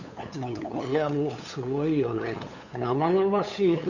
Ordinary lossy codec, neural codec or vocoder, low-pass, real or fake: none; codec, 16 kHz, 8 kbps, FunCodec, trained on LibriTTS, 25 frames a second; 7.2 kHz; fake